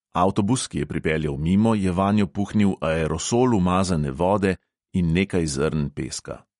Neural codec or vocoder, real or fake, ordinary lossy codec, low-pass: none; real; MP3, 48 kbps; 19.8 kHz